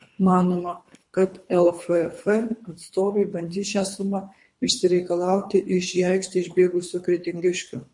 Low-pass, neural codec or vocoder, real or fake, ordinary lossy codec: 10.8 kHz; codec, 24 kHz, 3 kbps, HILCodec; fake; MP3, 48 kbps